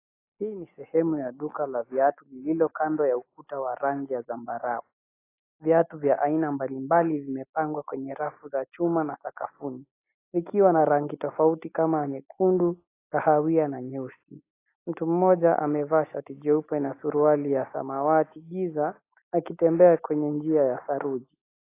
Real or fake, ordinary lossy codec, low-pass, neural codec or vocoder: real; AAC, 24 kbps; 3.6 kHz; none